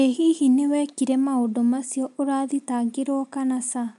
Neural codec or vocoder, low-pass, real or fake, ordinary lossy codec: none; 10.8 kHz; real; none